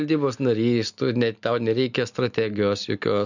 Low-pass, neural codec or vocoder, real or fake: 7.2 kHz; none; real